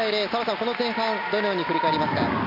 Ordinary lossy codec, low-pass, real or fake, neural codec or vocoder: none; 5.4 kHz; real; none